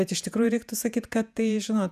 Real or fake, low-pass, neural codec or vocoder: fake; 14.4 kHz; vocoder, 48 kHz, 128 mel bands, Vocos